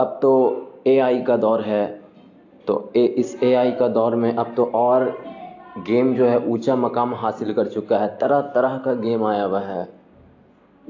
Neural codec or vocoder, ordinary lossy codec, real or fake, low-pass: none; AAC, 48 kbps; real; 7.2 kHz